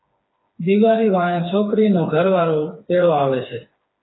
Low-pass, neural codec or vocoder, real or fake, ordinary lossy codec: 7.2 kHz; codec, 16 kHz, 4 kbps, FreqCodec, smaller model; fake; AAC, 16 kbps